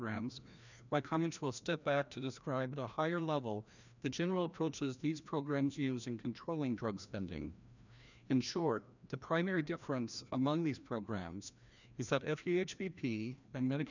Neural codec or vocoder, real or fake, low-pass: codec, 16 kHz, 1 kbps, FreqCodec, larger model; fake; 7.2 kHz